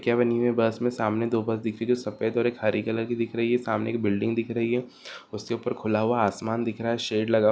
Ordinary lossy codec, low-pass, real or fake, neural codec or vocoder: none; none; real; none